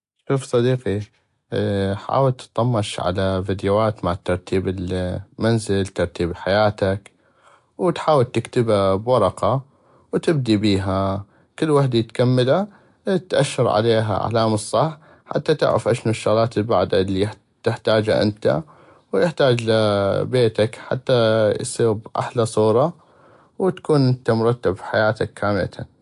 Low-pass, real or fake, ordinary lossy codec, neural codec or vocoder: 10.8 kHz; real; AAC, 64 kbps; none